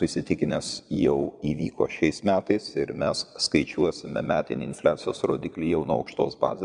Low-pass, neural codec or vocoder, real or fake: 9.9 kHz; vocoder, 22.05 kHz, 80 mel bands, Vocos; fake